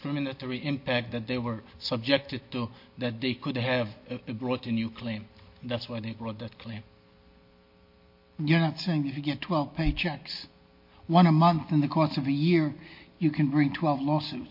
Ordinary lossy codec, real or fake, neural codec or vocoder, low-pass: MP3, 32 kbps; real; none; 5.4 kHz